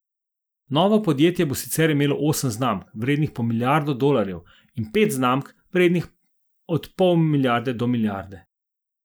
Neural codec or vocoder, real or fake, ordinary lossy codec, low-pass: none; real; none; none